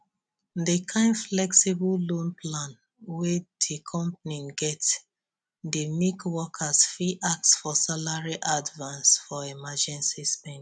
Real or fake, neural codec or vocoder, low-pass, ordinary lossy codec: real; none; 9.9 kHz; none